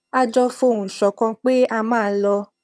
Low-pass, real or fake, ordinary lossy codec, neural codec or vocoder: none; fake; none; vocoder, 22.05 kHz, 80 mel bands, HiFi-GAN